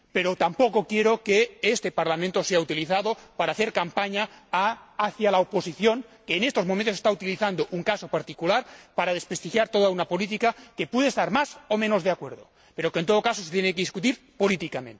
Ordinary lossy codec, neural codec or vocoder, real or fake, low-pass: none; none; real; none